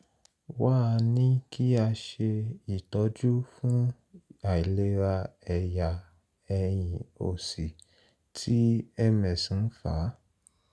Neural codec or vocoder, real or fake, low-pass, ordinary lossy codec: none; real; none; none